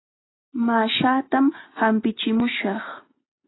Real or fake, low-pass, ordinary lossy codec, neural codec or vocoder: real; 7.2 kHz; AAC, 16 kbps; none